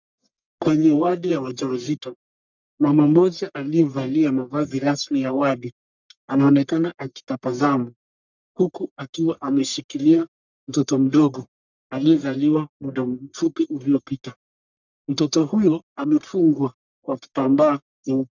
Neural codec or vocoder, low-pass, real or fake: codec, 44.1 kHz, 1.7 kbps, Pupu-Codec; 7.2 kHz; fake